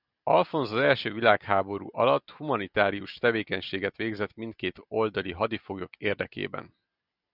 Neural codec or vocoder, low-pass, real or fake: none; 5.4 kHz; real